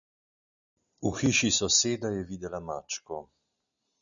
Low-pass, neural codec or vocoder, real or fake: 7.2 kHz; none; real